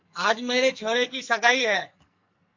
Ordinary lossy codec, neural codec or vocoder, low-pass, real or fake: MP3, 48 kbps; codec, 44.1 kHz, 2.6 kbps, SNAC; 7.2 kHz; fake